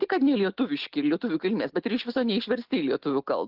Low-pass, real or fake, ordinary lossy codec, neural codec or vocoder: 5.4 kHz; real; Opus, 16 kbps; none